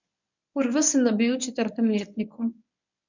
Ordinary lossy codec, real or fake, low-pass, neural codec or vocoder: none; fake; 7.2 kHz; codec, 24 kHz, 0.9 kbps, WavTokenizer, medium speech release version 1